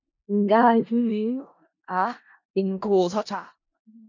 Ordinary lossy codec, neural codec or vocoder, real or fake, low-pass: MP3, 48 kbps; codec, 16 kHz in and 24 kHz out, 0.4 kbps, LongCat-Audio-Codec, four codebook decoder; fake; 7.2 kHz